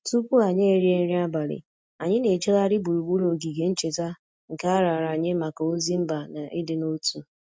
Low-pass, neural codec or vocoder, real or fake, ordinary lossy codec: none; none; real; none